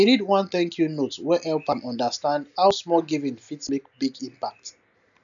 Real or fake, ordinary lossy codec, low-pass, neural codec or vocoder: real; none; 7.2 kHz; none